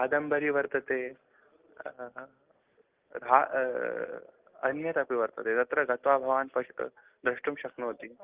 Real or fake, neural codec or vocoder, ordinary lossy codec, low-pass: real; none; Opus, 32 kbps; 3.6 kHz